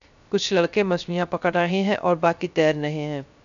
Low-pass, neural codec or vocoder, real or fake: 7.2 kHz; codec, 16 kHz, 0.3 kbps, FocalCodec; fake